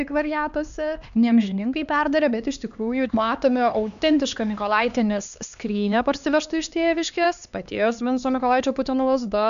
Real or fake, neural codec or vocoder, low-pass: fake; codec, 16 kHz, 2 kbps, X-Codec, HuBERT features, trained on LibriSpeech; 7.2 kHz